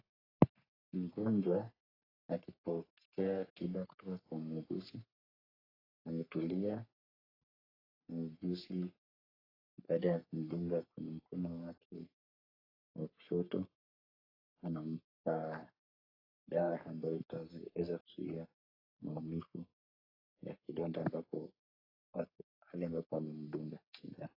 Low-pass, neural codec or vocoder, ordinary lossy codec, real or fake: 5.4 kHz; codec, 44.1 kHz, 3.4 kbps, Pupu-Codec; AAC, 24 kbps; fake